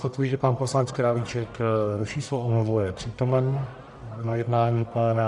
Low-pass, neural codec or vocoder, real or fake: 10.8 kHz; codec, 44.1 kHz, 1.7 kbps, Pupu-Codec; fake